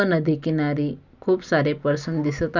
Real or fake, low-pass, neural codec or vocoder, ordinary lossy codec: real; 7.2 kHz; none; none